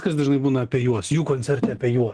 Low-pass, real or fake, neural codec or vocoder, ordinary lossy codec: 10.8 kHz; real; none; Opus, 16 kbps